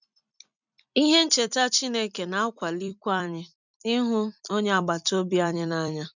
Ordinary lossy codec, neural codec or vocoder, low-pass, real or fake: none; vocoder, 44.1 kHz, 128 mel bands every 256 samples, BigVGAN v2; 7.2 kHz; fake